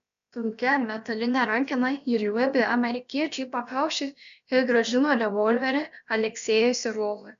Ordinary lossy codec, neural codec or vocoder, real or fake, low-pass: MP3, 96 kbps; codec, 16 kHz, about 1 kbps, DyCAST, with the encoder's durations; fake; 7.2 kHz